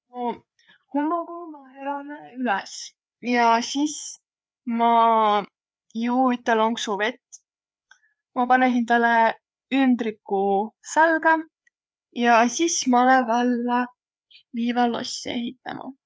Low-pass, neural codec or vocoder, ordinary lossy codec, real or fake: none; codec, 16 kHz, 4 kbps, FreqCodec, larger model; none; fake